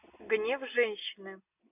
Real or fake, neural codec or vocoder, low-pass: real; none; 3.6 kHz